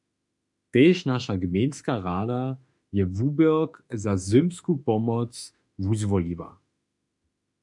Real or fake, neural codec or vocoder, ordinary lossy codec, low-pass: fake; autoencoder, 48 kHz, 32 numbers a frame, DAC-VAE, trained on Japanese speech; MP3, 96 kbps; 10.8 kHz